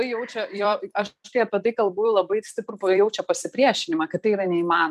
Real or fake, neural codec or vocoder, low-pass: fake; vocoder, 44.1 kHz, 128 mel bands every 256 samples, BigVGAN v2; 14.4 kHz